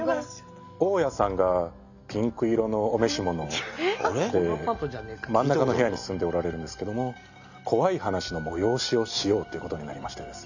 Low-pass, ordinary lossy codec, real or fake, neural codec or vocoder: 7.2 kHz; none; real; none